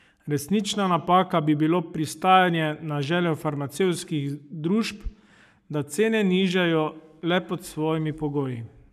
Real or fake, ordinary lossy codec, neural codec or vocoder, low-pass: fake; none; codec, 44.1 kHz, 7.8 kbps, Pupu-Codec; 14.4 kHz